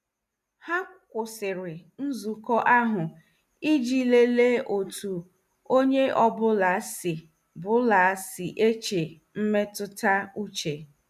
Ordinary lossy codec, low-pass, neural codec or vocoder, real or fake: none; 14.4 kHz; none; real